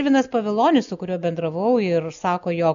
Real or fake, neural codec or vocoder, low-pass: real; none; 7.2 kHz